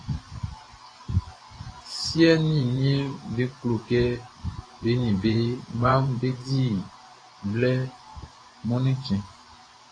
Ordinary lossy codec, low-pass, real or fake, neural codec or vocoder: AAC, 32 kbps; 9.9 kHz; fake; vocoder, 24 kHz, 100 mel bands, Vocos